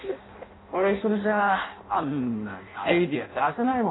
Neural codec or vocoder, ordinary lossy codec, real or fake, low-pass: codec, 16 kHz in and 24 kHz out, 0.6 kbps, FireRedTTS-2 codec; AAC, 16 kbps; fake; 7.2 kHz